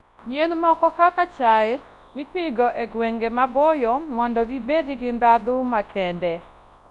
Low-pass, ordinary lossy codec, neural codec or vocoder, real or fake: 10.8 kHz; none; codec, 24 kHz, 0.9 kbps, WavTokenizer, large speech release; fake